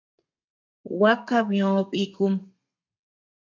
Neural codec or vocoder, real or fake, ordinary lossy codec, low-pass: codec, 32 kHz, 1.9 kbps, SNAC; fake; AAC, 48 kbps; 7.2 kHz